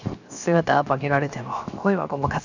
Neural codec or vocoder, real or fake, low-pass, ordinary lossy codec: codec, 16 kHz, 0.7 kbps, FocalCodec; fake; 7.2 kHz; none